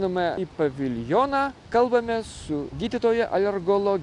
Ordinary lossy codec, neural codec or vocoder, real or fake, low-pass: AAC, 64 kbps; none; real; 10.8 kHz